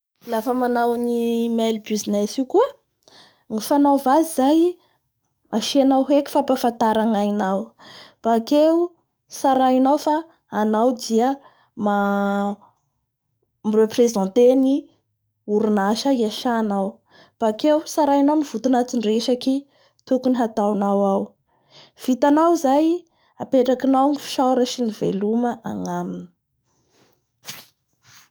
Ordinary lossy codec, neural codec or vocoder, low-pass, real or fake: none; codec, 44.1 kHz, 7.8 kbps, DAC; none; fake